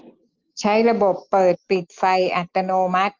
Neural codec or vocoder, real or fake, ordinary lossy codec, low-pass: none; real; Opus, 24 kbps; 7.2 kHz